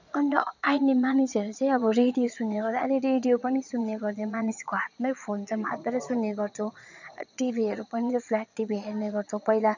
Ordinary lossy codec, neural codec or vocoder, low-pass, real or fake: none; vocoder, 22.05 kHz, 80 mel bands, Vocos; 7.2 kHz; fake